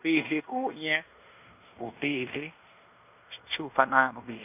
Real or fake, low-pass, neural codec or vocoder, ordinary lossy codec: fake; 3.6 kHz; codec, 16 kHz in and 24 kHz out, 0.9 kbps, LongCat-Audio-Codec, fine tuned four codebook decoder; none